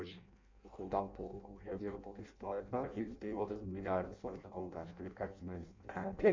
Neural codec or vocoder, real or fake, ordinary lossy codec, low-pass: codec, 16 kHz in and 24 kHz out, 0.6 kbps, FireRedTTS-2 codec; fake; MP3, 48 kbps; 7.2 kHz